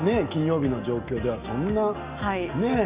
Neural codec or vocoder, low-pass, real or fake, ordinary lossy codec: none; 3.6 kHz; real; Opus, 64 kbps